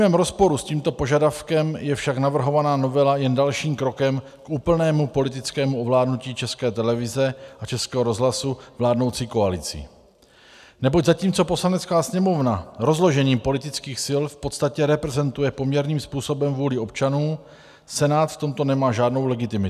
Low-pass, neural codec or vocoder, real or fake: 14.4 kHz; none; real